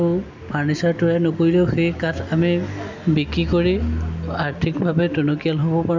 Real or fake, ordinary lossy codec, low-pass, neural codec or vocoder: real; MP3, 64 kbps; 7.2 kHz; none